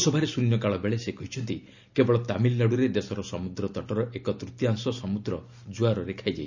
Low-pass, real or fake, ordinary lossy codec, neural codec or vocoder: 7.2 kHz; real; none; none